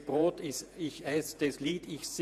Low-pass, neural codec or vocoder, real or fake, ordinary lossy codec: 14.4 kHz; vocoder, 48 kHz, 128 mel bands, Vocos; fake; none